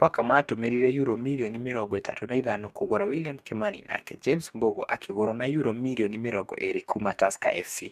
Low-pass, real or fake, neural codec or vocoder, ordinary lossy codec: 14.4 kHz; fake; codec, 44.1 kHz, 2.6 kbps, DAC; MP3, 96 kbps